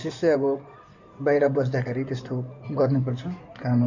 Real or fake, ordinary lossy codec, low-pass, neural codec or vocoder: fake; none; 7.2 kHz; codec, 16 kHz in and 24 kHz out, 2.2 kbps, FireRedTTS-2 codec